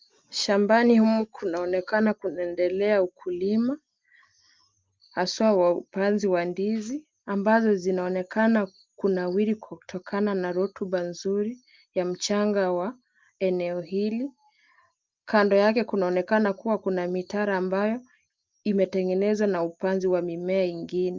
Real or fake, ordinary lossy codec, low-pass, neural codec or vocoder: real; Opus, 24 kbps; 7.2 kHz; none